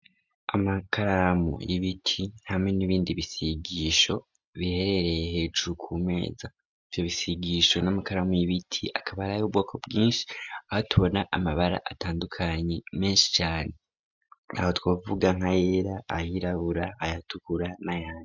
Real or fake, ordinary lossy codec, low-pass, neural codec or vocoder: real; MP3, 64 kbps; 7.2 kHz; none